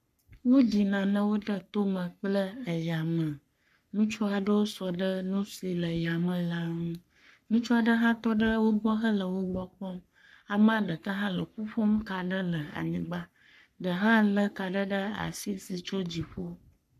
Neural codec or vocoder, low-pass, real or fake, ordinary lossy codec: codec, 44.1 kHz, 3.4 kbps, Pupu-Codec; 14.4 kHz; fake; MP3, 96 kbps